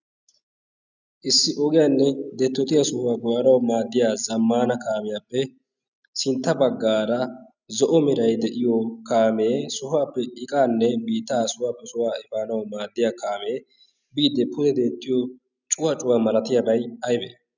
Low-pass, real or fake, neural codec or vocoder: 7.2 kHz; real; none